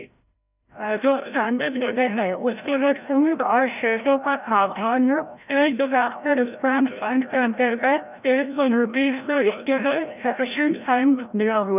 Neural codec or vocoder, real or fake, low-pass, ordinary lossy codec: codec, 16 kHz, 0.5 kbps, FreqCodec, larger model; fake; 3.6 kHz; none